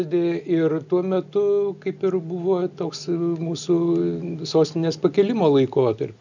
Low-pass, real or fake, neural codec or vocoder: 7.2 kHz; real; none